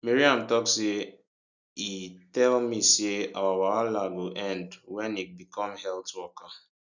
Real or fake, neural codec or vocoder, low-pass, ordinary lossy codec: real; none; 7.2 kHz; none